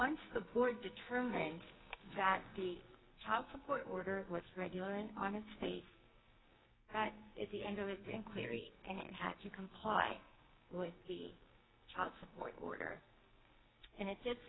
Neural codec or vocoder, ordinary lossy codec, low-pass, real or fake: codec, 44.1 kHz, 2.6 kbps, SNAC; AAC, 16 kbps; 7.2 kHz; fake